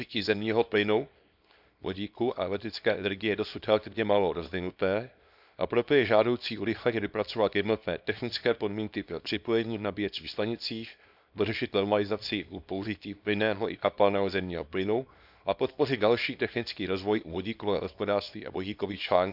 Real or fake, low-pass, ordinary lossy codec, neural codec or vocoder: fake; 5.4 kHz; none; codec, 24 kHz, 0.9 kbps, WavTokenizer, small release